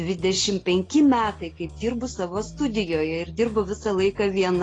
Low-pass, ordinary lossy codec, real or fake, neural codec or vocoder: 9.9 kHz; AAC, 32 kbps; real; none